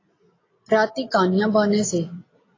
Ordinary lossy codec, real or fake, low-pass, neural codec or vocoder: AAC, 32 kbps; real; 7.2 kHz; none